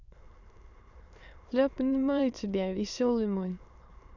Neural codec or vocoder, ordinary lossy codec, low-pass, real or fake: autoencoder, 22.05 kHz, a latent of 192 numbers a frame, VITS, trained on many speakers; none; 7.2 kHz; fake